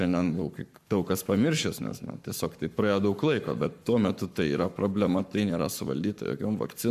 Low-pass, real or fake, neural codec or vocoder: 14.4 kHz; fake; codec, 44.1 kHz, 7.8 kbps, Pupu-Codec